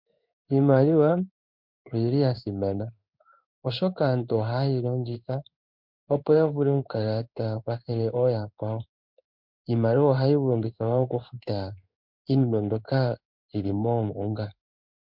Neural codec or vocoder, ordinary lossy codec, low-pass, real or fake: codec, 16 kHz in and 24 kHz out, 1 kbps, XY-Tokenizer; MP3, 48 kbps; 5.4 kHz; fake